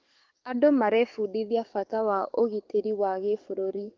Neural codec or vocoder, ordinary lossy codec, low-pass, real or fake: codec, 44.1 kHz, 7.8 kbps, DAC; Opus, 32 kbps; 7.2 kHz; fake